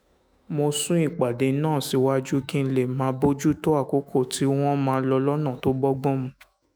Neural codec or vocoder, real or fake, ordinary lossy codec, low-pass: autoencoder, 48 kHz, 128 numbers a frame, DAC-VAE, trained on Japanese speech; fake; none; none